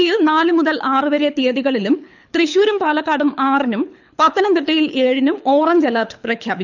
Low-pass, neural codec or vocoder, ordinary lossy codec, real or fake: 7.2 kHz; codec, 24 kHz, 6 kbps, HILCodec; none; fake